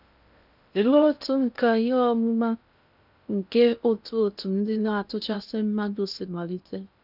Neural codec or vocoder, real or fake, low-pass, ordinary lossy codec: codec, 16 kHz in and 24 kHz out, 0.6 kbps, FocalCodec, streaming, 2048 codes; fake; 5.4 kHz; none